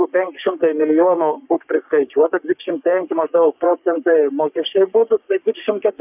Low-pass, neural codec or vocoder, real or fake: 3.6 kHz; codec, 44.1 kHz, 3.4 kbps, Pupu-Codec; fake